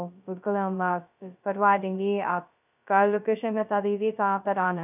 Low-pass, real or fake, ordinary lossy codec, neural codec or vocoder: 3.6 kHz; fake; none; codec, 16 kHz, 0.2 kbps, FocalCodec